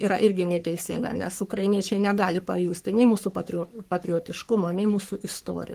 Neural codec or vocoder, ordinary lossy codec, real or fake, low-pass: codec, 44.1 kHz, 3.4 kbps, Pupu-Codec; Opus, 24 kbps; fake; 14.4 kHz